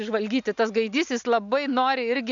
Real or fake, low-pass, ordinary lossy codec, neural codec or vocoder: real; 7.2 kHz; MP3, 64 kbps; none